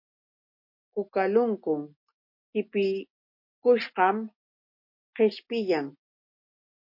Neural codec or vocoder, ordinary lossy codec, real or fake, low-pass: none; MP3, 32 kbps; real; 5.4 kHz